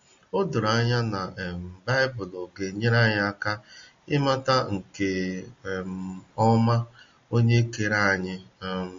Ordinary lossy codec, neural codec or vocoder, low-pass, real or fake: MP3, 48 kbps; none; 7.2 kHz; real